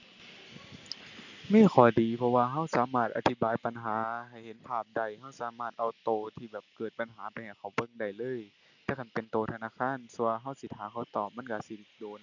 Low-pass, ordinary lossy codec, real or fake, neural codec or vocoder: 7.2 kHz; none; real; none